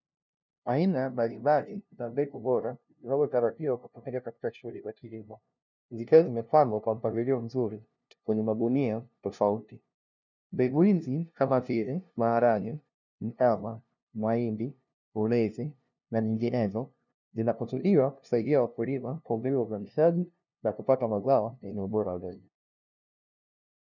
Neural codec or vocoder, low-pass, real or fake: codec, 16 kHz, 0.5 kbps, FunCodec, trained on LibriTTS, 25 frames a second; 7.2 kHz; fake